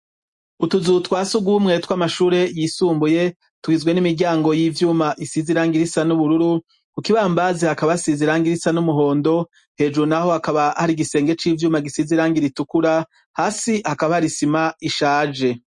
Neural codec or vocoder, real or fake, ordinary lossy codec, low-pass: none; real; MP3, 48 kbps; 10.8 kHz